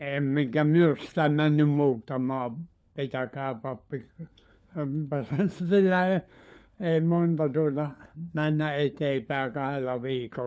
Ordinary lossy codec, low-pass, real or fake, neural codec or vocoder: none; none; fake; codec, 16 kHz, 4 kbps, FunCodec, trained on LibriTTS, 50 frames a second